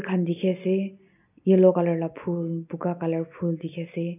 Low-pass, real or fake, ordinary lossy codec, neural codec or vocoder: 3.6 kHz; real; none; none